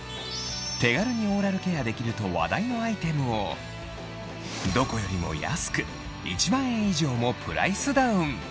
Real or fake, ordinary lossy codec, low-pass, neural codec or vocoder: real; none; none; none